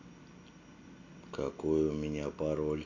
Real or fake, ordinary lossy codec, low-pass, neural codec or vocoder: real; none; 7.2 kHz; none